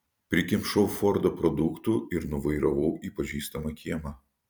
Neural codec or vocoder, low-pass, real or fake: vocoder, 44.1 kHz, 128 mel bands every 256 samples, BigVGAN v2; 19.8 kHz; fake